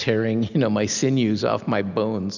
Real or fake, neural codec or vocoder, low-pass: real; none; 7.2 kHz